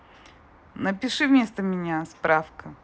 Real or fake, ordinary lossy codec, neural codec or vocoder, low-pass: real; none; none; none